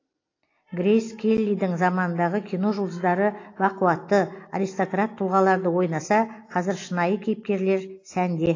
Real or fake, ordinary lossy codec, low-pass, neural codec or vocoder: real; AAC, 32 kbps; 7.2 kHz; none